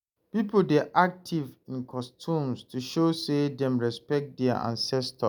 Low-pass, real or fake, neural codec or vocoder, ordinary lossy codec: none; real; none; none